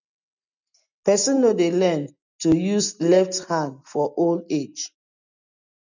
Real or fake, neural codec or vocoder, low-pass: real; none; 7.2 kHz